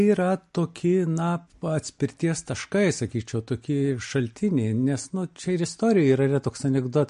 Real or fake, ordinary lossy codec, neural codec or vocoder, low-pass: real; MP3, 48 kbps; none; 10.8 kHz